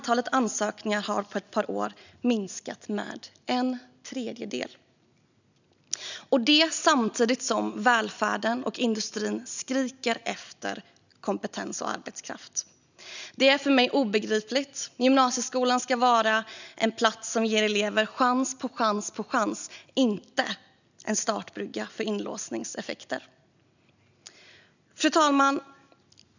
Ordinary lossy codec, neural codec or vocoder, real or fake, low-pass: none; none; real; 7.2 kHz